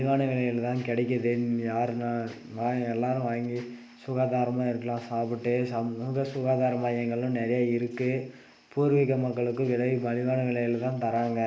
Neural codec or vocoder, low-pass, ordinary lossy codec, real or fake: none; none; none; real